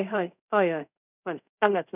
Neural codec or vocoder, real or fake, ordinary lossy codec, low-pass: codec, 24 kHz, 0.5 kbps, DualCodec; fake; none; 3.6 kHz